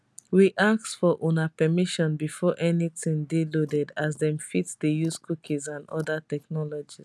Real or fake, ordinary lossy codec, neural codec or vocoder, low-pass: real; none; none; none